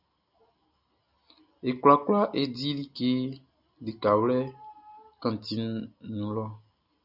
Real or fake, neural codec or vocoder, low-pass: real; none; 5.4 kHz